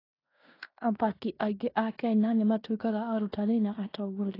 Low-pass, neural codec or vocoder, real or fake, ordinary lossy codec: 5.4 kHz; codec, 16 kHz in and 24 kHz out, 0.9 kbps, LongCat-Audio-Codec, fine tuned four codebook decoder; fake; AAC, 24 kbps